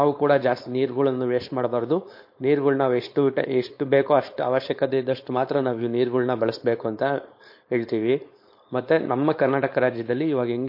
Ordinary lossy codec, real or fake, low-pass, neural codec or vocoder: MP3, 32 kbps; fake; 5.4 kHz; codec, 16 kHz, 4.8 kbps, FACodec